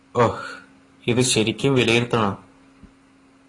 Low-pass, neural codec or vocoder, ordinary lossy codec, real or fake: 10.8 kHz; none; AAC, 32 kbps; real